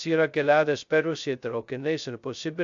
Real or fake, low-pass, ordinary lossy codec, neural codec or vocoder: fake; 7.2 kHz; AAC, 64 kbps; codec, 16 kHz, 0.2 kbps, FocalCodec